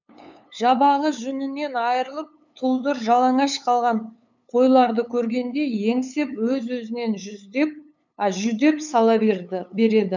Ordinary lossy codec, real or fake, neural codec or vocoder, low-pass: none; fake; codec, 16 kHz, 8 kbps, FunCodec, trained on LibriTTS, 25 frames a second; 7.2 kHz